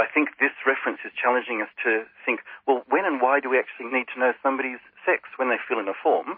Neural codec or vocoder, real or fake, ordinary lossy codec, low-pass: none; real; MP3, 24 kbps; 5.4 kHz